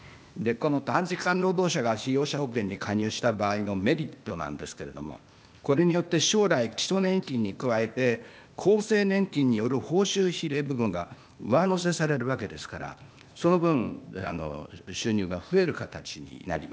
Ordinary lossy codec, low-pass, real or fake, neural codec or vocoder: none; none; fake; codec, 16 kHz, 0.8 kbps, ZipCodec